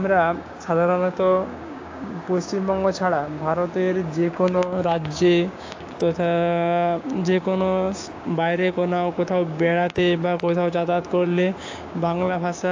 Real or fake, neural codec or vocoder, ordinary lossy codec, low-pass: fake; codec, 16 kHz, 6 kbps, DAC; AAC, 48 kbps; 7.2 kHz